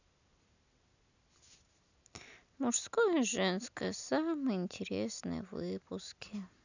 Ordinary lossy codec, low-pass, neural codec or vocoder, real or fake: none; 7.2 kHz; none; real